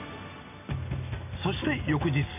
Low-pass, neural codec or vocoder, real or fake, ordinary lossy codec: 3.6 kHz; none; real; none